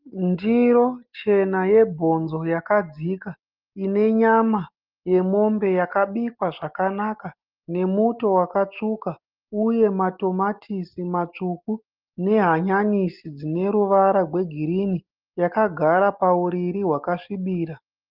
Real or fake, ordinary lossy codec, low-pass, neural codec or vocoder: real; Opus, 32 kbps; 5.4 kHz; none